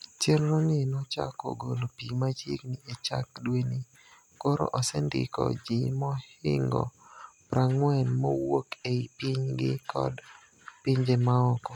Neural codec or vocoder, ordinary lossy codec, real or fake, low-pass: none; none; real; 19.8 kHz